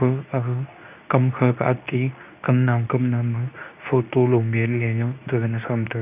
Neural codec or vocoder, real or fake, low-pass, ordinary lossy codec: codec, 24 kHz, 0.9 kbps, WavTokenizer, medium speech release version 2; fake; 3.6 kHz; none